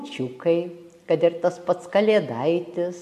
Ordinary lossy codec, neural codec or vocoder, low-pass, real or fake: MP3, 96 kbps; none; 14.4 kHz; real